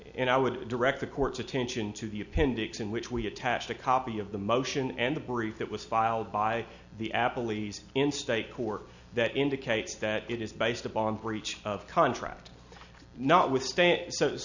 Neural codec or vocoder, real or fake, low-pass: none; real; 7.2 kHz